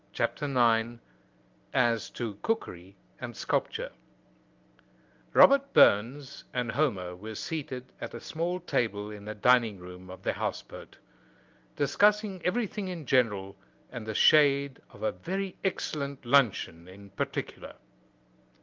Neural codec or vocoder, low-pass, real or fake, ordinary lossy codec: none; 7.2 kHz; real; Opus, 32 kbps